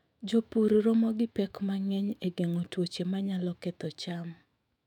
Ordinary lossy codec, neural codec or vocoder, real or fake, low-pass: none; autoencoder, 48 kHz, 128 numbers a frame, DAC-VAE, trained on Japanese speech; fake; 19.8 kHz